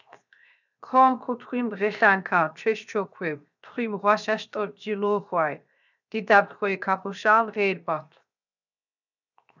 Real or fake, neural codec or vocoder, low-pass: fake; codec, 16 kHz, 0.7 kbps, FocalCodec; 7.2 kHz